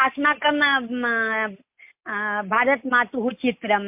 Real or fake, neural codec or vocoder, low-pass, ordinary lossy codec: real; none; 3.6 kHz; MP3, 32 kbps